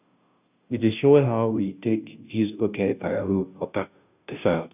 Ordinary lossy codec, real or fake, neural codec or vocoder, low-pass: none; fake; codec, 16 kHz, 0.5 kbps, FunCodec, trained on Chinese and English, 25 frames a second; 3.6 kHz